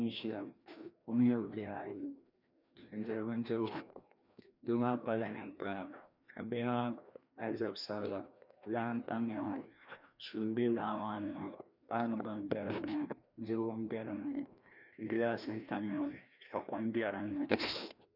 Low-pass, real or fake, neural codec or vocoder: 5.4 kHz; fake; codec, 16 kHz, 1 kbps, FreqCodec, larger model